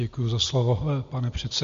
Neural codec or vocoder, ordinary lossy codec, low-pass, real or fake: none; MP3, 48 kbps; 7.2 kHz; real